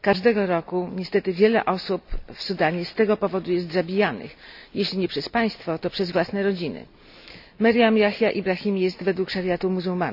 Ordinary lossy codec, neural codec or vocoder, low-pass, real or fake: none; none; 5.4 kHz; real